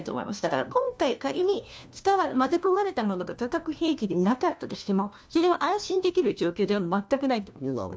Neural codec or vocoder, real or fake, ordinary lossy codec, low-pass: codec, 16 kHz, 1 kbps, FunCodec, trained on LibriTTS, 50 frames a second; fake; none; none